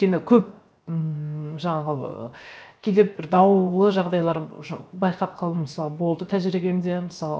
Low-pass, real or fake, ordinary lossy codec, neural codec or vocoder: none; fake; none; codec, 16 kHz, 0.7 kbps, FocalCodec